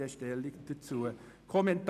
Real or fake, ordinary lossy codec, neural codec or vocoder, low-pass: real; none; none; 14.4 kHz